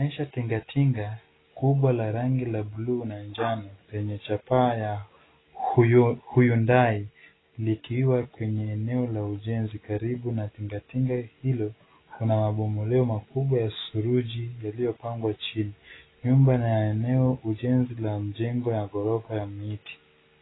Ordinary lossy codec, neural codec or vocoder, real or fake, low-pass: AAC, 16 kbps; none; real; 7.2 kHz